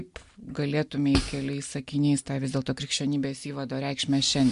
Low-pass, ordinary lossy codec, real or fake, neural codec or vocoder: 10.8 kHz; MP3, 64 kbps; real; none